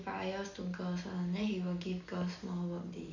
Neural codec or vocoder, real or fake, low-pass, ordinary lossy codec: none; real; 7.2 kHz; none